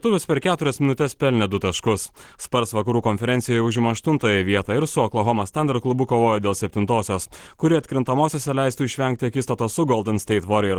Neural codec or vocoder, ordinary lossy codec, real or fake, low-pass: none; Opus, 16 kbps; real; 19.8 kHz